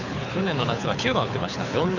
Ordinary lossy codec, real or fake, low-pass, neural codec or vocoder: none; fake; 7.2 kHz; codec, 24 kHz, 6 kbps, HILCodec